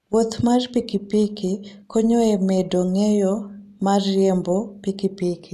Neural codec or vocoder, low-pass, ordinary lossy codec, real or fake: none; 14.4 kHz; none; real